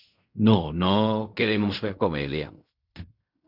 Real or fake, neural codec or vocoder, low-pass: fake; codec, 16 kHz in and 24 kHz out, 0.4 kbps, LongCat-Audio-Codec, fine tuned four codebook decoder; 5.4 kHz